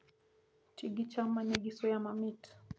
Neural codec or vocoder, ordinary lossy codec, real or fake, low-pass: none; none; real; none